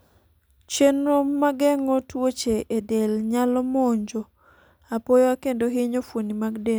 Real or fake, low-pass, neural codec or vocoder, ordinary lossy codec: real; none; none; none